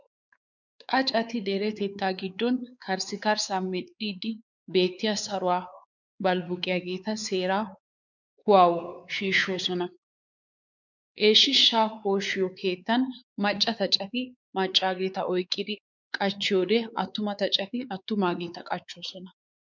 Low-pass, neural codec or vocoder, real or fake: 7.2 kHz; codec, 16 kHz, 4 kbps, X-Codec, WavLM features, trained on Multilingual LibriSpeech; fake